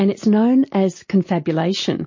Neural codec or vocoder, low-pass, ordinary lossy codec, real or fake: none; 7.2 kHz; MP3, 32 kbps; real